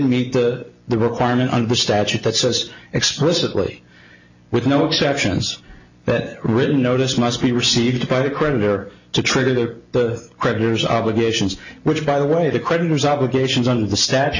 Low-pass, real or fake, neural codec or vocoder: 7.2 kHz; real; none